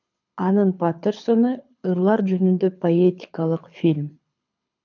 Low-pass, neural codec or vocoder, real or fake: 7.2 kHz; codec, 24 kHz, 6 kbps, HILCodec; fake